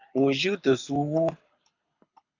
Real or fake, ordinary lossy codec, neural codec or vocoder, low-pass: fake; AAC, 48 kbps; codec, 24 kHz, 6 kbps, HILCodec; 7.2 kHz